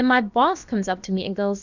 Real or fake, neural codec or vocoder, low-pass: fake; codec, 16 kHz, about 1 kbps, DyCAST, with the encoder's durations; 7.2 kHz